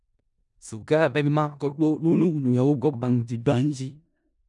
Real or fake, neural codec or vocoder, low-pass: fake; codec, 16 kHz in and 24 kHz out, 0.4 kbps, LongCat-Audio-Codec, four codebook decoder; 10.8 kHz